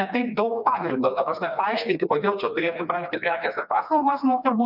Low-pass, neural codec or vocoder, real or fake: 5.4 kHz; codec, 16 kHz, 2 kbps, FreqCodec, smaller model; fake